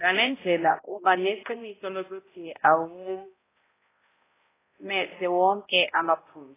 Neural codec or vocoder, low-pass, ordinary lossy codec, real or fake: codec, 16 kHz, 0.5 kbps, X-Codec, HuBERT features, trained on balanced general audio; 3.6 kHz; AAC, 16 kbps; fake